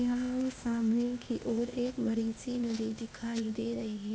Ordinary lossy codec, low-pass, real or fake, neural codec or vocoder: none; none; fake; codec, 16 kHz, 0.8 kbps, ZipCodec